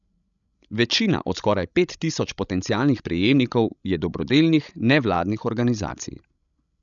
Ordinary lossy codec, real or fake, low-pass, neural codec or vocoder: none; fake; 7.2 kHz; codec, 16 kHz, 16 kbps, FreqCodec, larger model